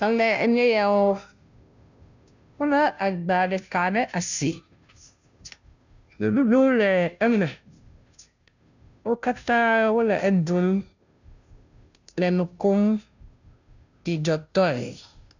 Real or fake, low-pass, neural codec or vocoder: fake; 7.2 kHz; codec, 16 kHz, 0.5 kbps, FunCodec, trained on Chinese and English, 25 frames a second